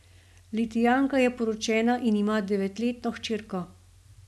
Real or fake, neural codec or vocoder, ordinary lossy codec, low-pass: real; none; none; none